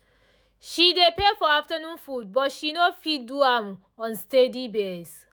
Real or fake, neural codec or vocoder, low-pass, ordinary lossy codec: fake; autoencoder, 48 kHz, 128 numbers a frame, DAC-VAE, trained on Japanese speech; none; none